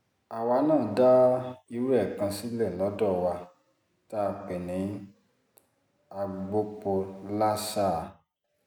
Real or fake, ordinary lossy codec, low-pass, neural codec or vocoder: real; none; 19.8 kHz; none